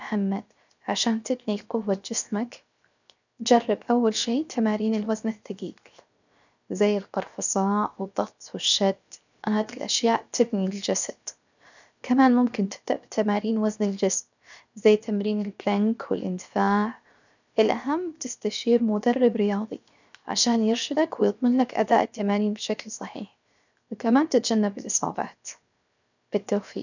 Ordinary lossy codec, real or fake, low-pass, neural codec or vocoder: none; fake; 7.2 kHz; codec, 16 kHz, 0.7 kbps, FocalCodec